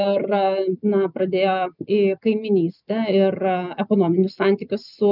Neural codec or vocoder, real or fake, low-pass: none; real; 5.4 kHz